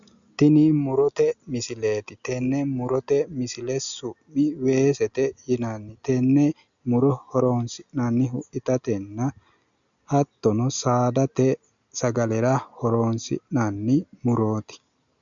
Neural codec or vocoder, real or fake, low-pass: none; real; 7.2 kHz